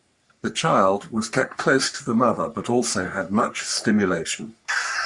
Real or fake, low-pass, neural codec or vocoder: fake; 10.8 kHz; codec, 44.1 kHz, 3.4 kbps, Pupu-Codec